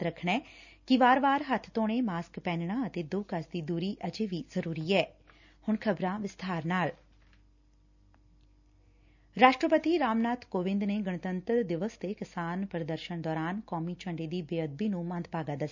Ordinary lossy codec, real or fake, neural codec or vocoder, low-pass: none; real; none; 7.2 kHz